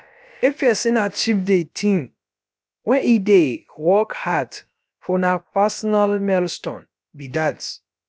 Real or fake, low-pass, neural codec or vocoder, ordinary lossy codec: fake; none; codec, 16 kHz, about 1 kbps, DyCAST, with the encoder's durations; none